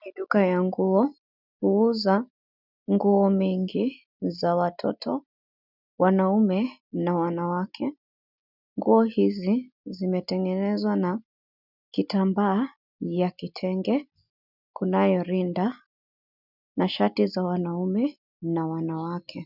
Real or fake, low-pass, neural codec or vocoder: real; 5.4 kHz; none